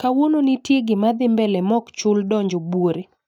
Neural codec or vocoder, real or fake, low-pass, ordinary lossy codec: none; real; 19.8 kHz; none